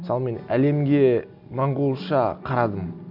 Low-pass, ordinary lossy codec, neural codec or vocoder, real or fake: 5.4 kHz; none; none; real